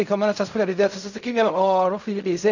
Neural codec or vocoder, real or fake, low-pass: codec, 16 kHz in and 24 kHz out, 0.4 kbps, LongCat-Audio-Codec, fine tuned four codebook decoder; fake; 7.2 kHz